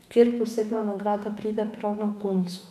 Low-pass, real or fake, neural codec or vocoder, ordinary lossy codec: 14.4 kHz; fake; autoencoder, 48 kHz, 32 numbers a frame, DAC-VAE, trained on Japanese speech; none